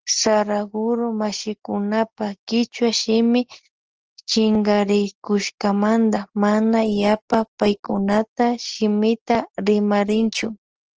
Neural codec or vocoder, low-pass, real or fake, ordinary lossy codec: none; 7.2 kHz; real; Opus, 16 kbps